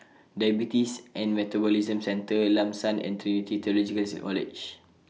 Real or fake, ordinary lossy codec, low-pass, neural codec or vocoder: real; none; none; none